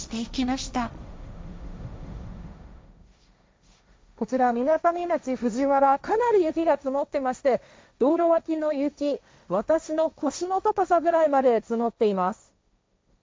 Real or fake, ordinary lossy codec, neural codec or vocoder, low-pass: fake; none; codec, 16 kHz, 1.1 kbps, Voila-Tokenizer; none